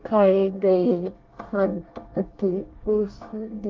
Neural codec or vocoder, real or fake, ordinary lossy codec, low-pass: codec, 24 kHz, 1 kbps, SNAC; fake; Opus, 32 kbps; 7.2 kHz